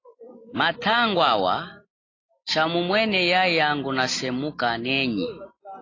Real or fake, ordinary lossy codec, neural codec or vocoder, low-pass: real; AAC, 32 kbps; none; 7.2 kHz